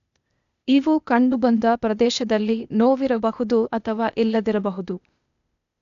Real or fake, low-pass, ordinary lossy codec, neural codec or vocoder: fake; 7.2 kHz; none; codec, 16 kHz, 0.8 kbps, ZipCodec